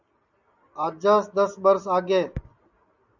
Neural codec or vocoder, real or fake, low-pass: none; real; 7.2 kHz